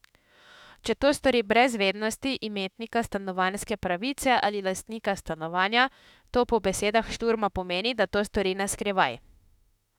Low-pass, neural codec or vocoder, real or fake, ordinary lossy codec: 19.8 kHz; autoencoder, 48 kHz, 32 numbers a frame, DAC-VAE, trained on Japanese speech; fake; none